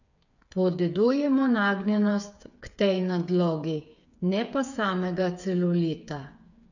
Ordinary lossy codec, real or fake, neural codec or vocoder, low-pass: none; fake; codec, 16 kHz, 8 kbps, FreqCodec, smaller model; 7.2 kHz